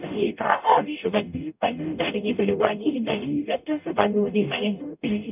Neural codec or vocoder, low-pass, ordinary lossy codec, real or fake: codec, 44.1 kHz, 0.9 kbps, DAC; 3.6 kHz; none; fake